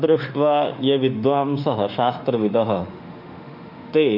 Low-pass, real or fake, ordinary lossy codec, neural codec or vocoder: 5.4 kHz; fake; none; autoencoder, 48 kHz, 32 numbers a frame, DAC-VAE, trained on Japanese speech